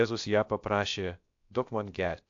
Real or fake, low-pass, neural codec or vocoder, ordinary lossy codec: fake; 7.2 kHz; codec, 16 kHz, about 1 kbps, DyCAST, with the encoder's durations; AAC, 64 kbps